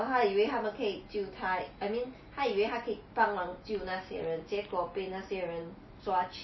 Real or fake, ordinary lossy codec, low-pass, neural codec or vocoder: real; MP3, 24 kbps; 7.2 kHz; none